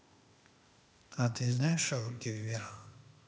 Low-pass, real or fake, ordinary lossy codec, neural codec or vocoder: none; fake; none; codec, 16 kHz, 0.8 kbps, ZipCodec